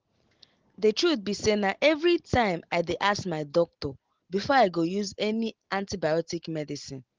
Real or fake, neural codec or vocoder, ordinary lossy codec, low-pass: real; none; Opus, 16 kbps; 7.2 kHz